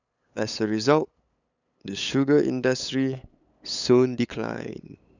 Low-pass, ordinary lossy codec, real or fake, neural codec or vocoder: 7.2 kHz; none; fake; codec, 16 kHz, 8 kbps, FunCodec, trained on LibriTTS, 25 frames a second